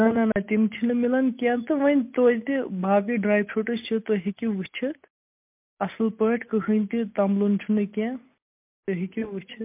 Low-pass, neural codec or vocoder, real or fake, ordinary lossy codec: 3.6 kHz; none; real; MP3, 32 kbps